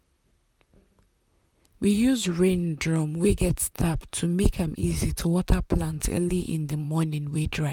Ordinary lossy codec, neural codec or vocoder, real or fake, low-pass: none; vocoder, 44.1 kHz, 128 mel bands, Pupu-Vocoder; fake; 19.8 kHz